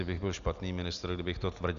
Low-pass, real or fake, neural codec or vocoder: 7.2 kHz; real; none